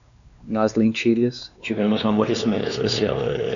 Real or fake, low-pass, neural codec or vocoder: fake; 7.2 kHz; codec, 16 kHz, 2 kbps, X-Codec, WavLM features, trained on Multilingual LibriSpeech